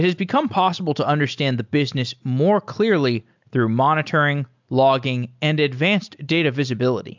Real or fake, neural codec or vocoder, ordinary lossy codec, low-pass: real; none; MP3, 64 kbps; 7.2 kHz